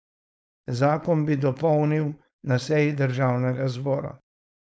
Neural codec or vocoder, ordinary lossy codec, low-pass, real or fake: codec, 16 kHz, 4.8 kbps, FACodec; none; none; fake